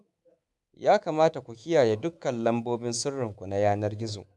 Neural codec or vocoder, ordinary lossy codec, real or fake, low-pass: codec, 24 kHz, 3.1 kbps, DualCodec; none; fake; none